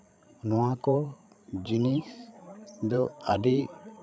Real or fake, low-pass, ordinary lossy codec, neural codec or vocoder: fake; none; none; codec, 16 kHz, 16 kbps, FreqCodec, larger model